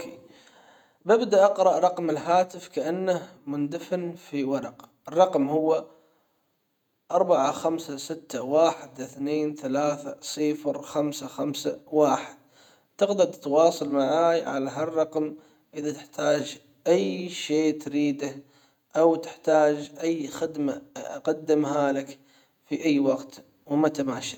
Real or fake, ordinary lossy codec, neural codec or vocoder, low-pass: fake; none; vocoder, 48 kHz, 128 mel bands, Vocos; 19.8 kHz